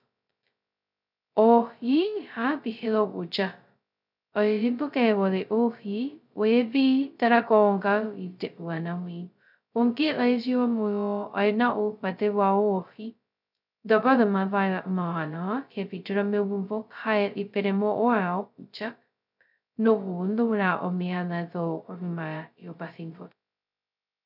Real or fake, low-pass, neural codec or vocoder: fake; 5.4 kHz; codec, 16 kHz, 0.2 kbps, FocalCodec